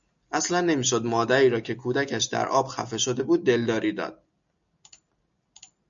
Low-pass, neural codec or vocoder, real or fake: 7.2 kHz; none; real